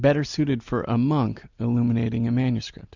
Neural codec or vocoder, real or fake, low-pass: none; real; 7.2 kHz